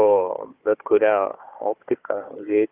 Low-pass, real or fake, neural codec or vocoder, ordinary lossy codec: 3.6 kHz; fake; codec, 16 kHz, 4 kbps, FunCodec, trained on Chinese and English, 50 frames a second; Opus, 24 kbps